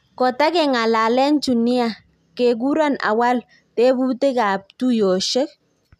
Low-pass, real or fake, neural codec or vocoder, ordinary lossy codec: 14.4 kHz; real; none; none